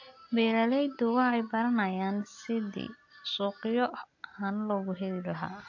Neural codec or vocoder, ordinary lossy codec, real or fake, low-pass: none; AAC, 48 kbps; real; 7.2 kHz